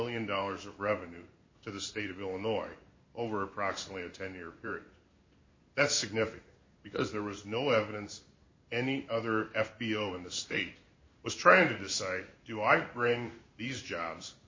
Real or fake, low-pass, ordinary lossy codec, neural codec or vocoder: fake; 7.2 kHz; MP3, 32 kbps; codec, 16 kHz in and 24 kHz out, 1 kbps, XY-Tokenizer